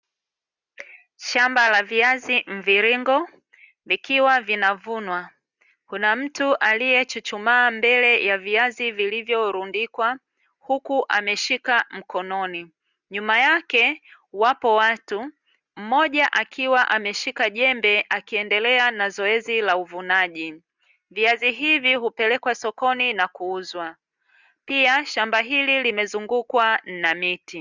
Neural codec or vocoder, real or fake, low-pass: none; real; 7.2 kHz